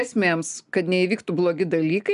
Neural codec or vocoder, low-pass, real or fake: none; 10.8 kHz; real